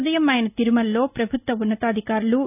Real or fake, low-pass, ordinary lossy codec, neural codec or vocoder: real; 3.6 kHz; none; none